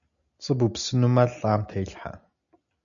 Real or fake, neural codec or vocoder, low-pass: real; none; 7.2 kHz